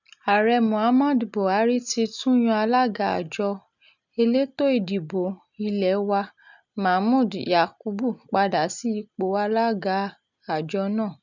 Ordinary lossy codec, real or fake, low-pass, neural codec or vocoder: none; real; 7.2 kHz; none